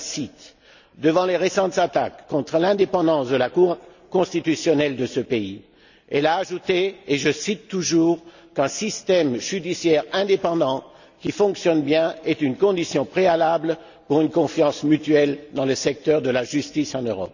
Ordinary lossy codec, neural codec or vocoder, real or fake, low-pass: none; none; real; 7.2 kHz